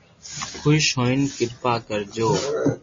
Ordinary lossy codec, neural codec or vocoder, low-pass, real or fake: MP3, 32 kbps; none; 7.2 kHz; real